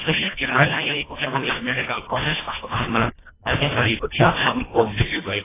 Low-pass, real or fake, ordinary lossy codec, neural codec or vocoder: 3.6 kHz; fake; AAC, 16 kbps; codec, 24 kHz, 1.5 kbps, HILCodec